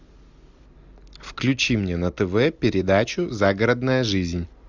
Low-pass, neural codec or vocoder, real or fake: 7.2 kHz; none; real